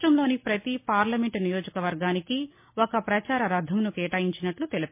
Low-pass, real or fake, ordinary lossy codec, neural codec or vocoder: 3.6 kHz; real; MP3, 24 kbps; none